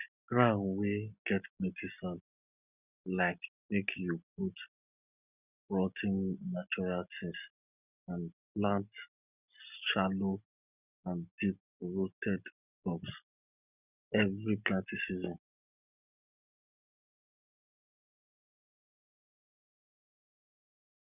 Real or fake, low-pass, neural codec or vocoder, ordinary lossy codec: real; 3.6 kHz; none; none